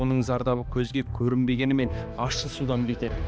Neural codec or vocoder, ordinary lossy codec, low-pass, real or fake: codec, 16 kHz, 2 kbps, X-Codec, HuBERT features, trained on balanced general audio; none; none; fake